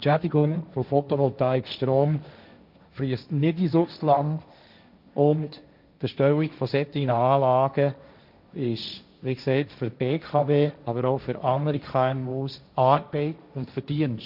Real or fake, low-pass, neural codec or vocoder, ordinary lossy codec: fake; 5.4 kHz; codec, 16 kHz, 1.1 kbps, Voila-Tokenizer; none